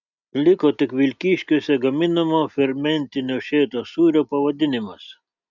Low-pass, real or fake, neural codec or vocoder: 7.2 kHz; real; none